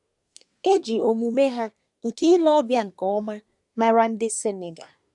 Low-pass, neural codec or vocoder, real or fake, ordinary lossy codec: 10.8 kHz; codec, 24 kHz, 1 kbps, SNAC; fake; none